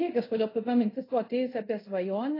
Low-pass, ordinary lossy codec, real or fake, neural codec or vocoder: 5.4 kHz; AAC, 24 kbps; fake; codec, 24 kHz, 0.5 kbps, DualCodec